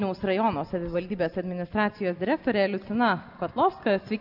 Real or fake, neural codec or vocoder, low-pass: real; none; 5.4 kHz